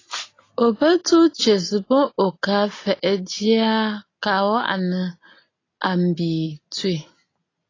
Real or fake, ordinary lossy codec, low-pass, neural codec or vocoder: real; AAC, 32 kbps; 7.2 kHz; none